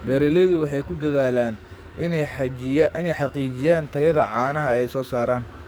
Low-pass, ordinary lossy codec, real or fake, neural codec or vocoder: none; none; fake; codec, 44.1 kHz, 2.6 kbps, SNAC